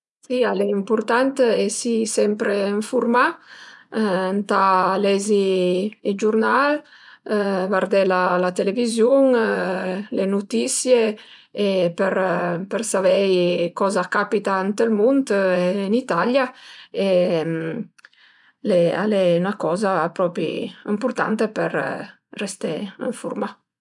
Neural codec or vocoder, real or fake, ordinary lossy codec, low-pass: vocoder, 24 kHz, 100 mel bands, Vocos; fake; none; 10.8 kHz